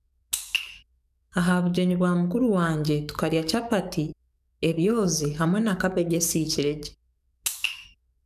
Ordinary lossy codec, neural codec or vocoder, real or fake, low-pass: none; codec, 44.1 kHz, 7.8 kbps, DAC; fake; 14.4 kHz